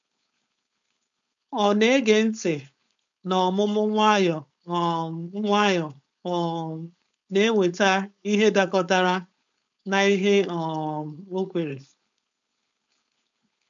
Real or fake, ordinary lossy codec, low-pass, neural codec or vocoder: fake; MP3, 64 kbps; 7.2 kHz; codec, 16 kHz, 4.8 kbps, FACodec